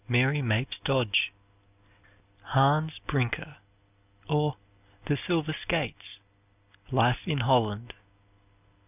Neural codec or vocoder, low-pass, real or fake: none; 3.6 kHz; real